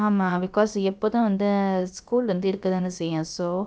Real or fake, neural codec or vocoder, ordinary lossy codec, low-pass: fake; codec, 16 kHz, about 1 kbps, DyCAST, with the encoder's durations; none; none